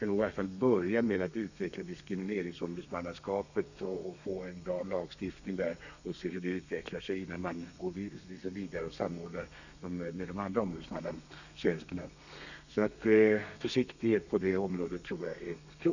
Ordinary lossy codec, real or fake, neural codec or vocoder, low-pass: none; fake; codec, 32 kHz, 1.9 kbps, SNAC; 7.2 kHz